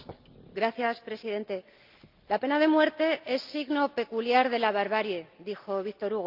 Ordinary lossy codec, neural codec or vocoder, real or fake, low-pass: Opus, 24 kbps; none; real; 5.4 kHz